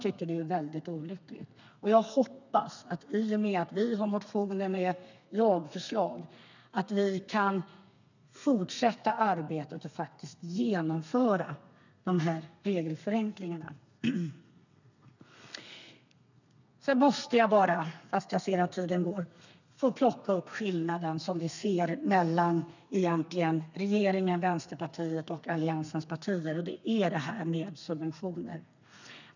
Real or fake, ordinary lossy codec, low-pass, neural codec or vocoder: fake; none; 7.2 kHz; codec, 32 kHz, 1.9 kbps, SNAC